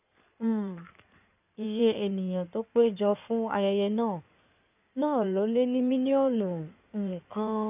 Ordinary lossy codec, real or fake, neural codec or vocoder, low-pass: none; fake; codec, 16 kHz in and 24 kHz out, 2.2 kbps, FireRedTTS-2 codec; 3.6 kHz